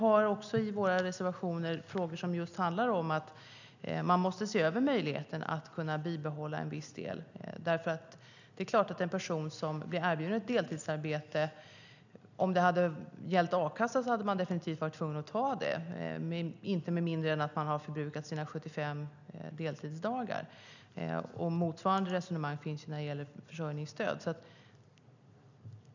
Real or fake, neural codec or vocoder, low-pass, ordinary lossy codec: real; none; 7.2 kHz; none